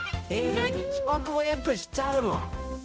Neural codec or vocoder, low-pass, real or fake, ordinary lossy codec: codec, 16 kHz, 0.5 kbps, X-Codec, HuBERT features, trained on balanced general audio; none; fake; none